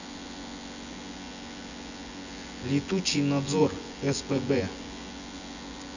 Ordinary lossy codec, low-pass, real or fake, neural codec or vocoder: none; 7.2 kHz; fake; vocoder, 24 kHz, 100 mel bands, Vocos